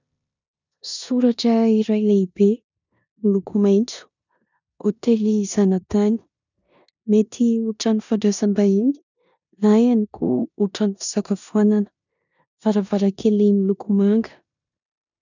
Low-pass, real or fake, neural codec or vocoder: 7.2 kHz; fake; codec, 16 kHz in and 24 kHz out, 0.9 kbps, LongCat-Audio-Codec, four codebook decoder